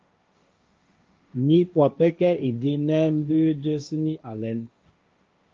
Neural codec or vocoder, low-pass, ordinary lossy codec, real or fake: codec, 16 kHz, 1.1 kbps, Voila-Tokenizer; 7.2 kHz; Opus, 24 kbps; fake